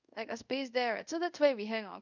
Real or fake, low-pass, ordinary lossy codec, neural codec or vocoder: fake; 7.2 kHz; none; codec, 24 kHz, 0.5 kbps, DualCodec